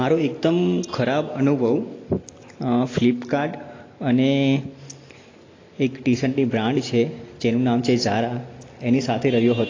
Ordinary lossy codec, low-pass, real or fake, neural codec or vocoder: AAC, 32 kbps; 7.2 kHz; real; none